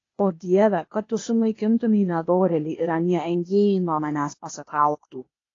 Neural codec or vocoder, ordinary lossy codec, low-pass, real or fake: codec, 16 kHz, 0.8 kbps, ZipCodec; AAC, 32 kbps; 7.2 kHz; fake